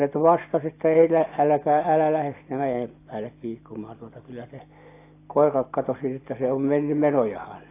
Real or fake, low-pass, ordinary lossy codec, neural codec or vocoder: real; 3.6 kHz; AAC, 24 kbps; none